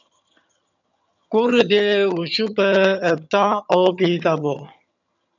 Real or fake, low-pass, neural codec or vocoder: fake; 7.2 kHz; vocoder, 22.05 kHz, 80 mel bands, HiFi-GAN